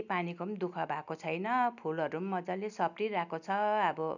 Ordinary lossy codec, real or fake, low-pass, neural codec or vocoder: none; real; 7.2 kHz; none